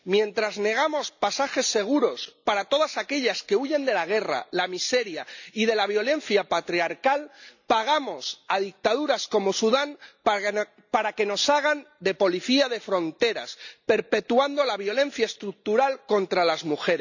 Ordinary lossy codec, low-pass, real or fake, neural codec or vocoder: none; 7.2 kHz; real; none